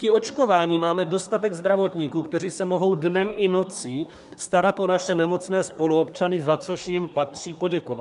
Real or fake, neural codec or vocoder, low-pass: fake; codec, 24 kHz, 1 kbps, SNAC; 10.8 kHz